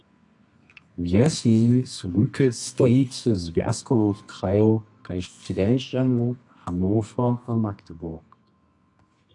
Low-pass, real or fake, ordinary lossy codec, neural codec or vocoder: 10.8 kHz; fake; AAC, 64 kbps; codec, 24 kHz, 0.9 kbps, WavTokenizer, medium music audio release